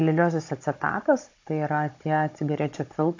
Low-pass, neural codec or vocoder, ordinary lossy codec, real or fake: 7.2 kHz; codec, 16 kHz, 16 kbps, FunCodec, trained on Chinese and English, 50 frames a second; AAC, 48 kbps; fake